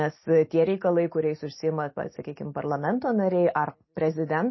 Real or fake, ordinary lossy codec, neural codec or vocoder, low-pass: real; MP3, 24 kbps; none; 7.2 kHz